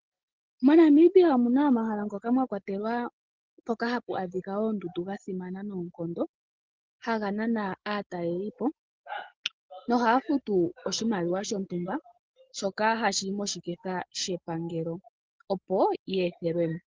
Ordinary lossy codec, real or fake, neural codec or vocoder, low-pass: Opus, 16 kbps; real; none; 7.2 kHz